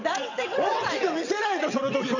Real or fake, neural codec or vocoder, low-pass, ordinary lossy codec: fake; vocoder, 22.05 kHz, 80 mel bands, WaveNeXt; 7.2 kHz; none